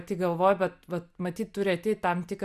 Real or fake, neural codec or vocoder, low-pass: real; none; 14.4 kHz